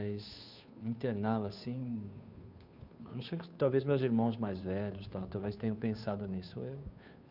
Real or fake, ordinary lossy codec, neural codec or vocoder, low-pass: fake; none; codec, 16 kHz, 2 kbps, FunCodec, trained on Chinese and English, 25 frames a second; 5.4 kHz